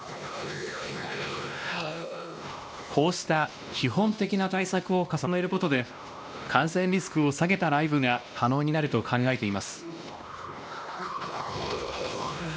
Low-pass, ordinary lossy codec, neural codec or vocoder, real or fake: none; none; codec, 16 kHz, 1 kbps, X-Codec, WavLM features, trained on Multilingual LibriSpeech; fake